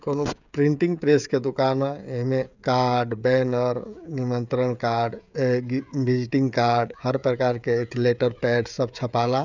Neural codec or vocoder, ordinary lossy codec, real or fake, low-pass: codec, 16 kHz, 16 kbps, FreqCodec, smaller model; none; fake; 7.2 kHz